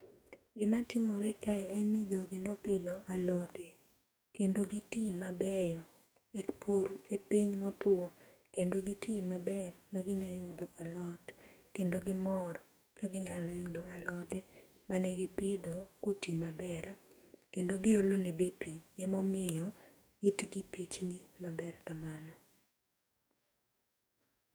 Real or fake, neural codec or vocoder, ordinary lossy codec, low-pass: fake; codec, 44.1 kHz, 2.6 kbps, DAC; none; none